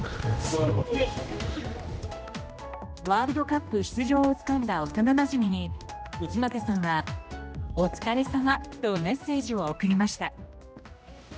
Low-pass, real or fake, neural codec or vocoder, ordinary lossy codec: none; fake; codec, 16 kHz, 1 kbps, X-Codec, HuBERT features, trained on general audio; none